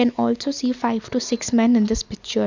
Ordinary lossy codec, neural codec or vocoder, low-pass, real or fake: none; none; 7.2 kHz; real